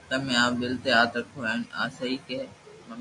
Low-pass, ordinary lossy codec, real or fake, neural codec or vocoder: 10.8 kHz; AAC, 64 kbps; real; none